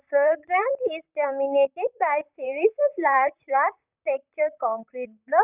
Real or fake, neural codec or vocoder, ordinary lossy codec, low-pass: fake; vocoder, 44.1 kHz, 128 mel bands, Pupu-Vocoder; none; 3.6 kHz